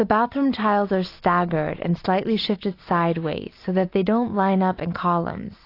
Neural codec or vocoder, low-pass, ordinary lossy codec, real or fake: none; 5.4 kHz; AAC, 32 kbps; real